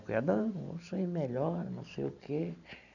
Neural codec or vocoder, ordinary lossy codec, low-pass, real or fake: vocoder, 44.1 kHz, 128 mel bands every 512 samples, BigVGAN v2; none; 7.2 kHz; fake